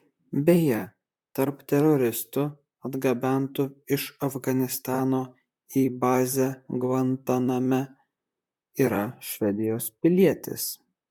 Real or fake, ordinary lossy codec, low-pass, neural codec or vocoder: fake; MP3, 96 kbps; 19.8 kHz; vocoder, 44.1 kHz, 128 mel bands, Pupu-Vocoder